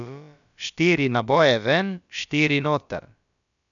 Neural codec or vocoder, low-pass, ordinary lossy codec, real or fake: codec, 16 kHz, about 1 kbps, DyCAST, with the encoder's durations; 7.2 kHz; none; fake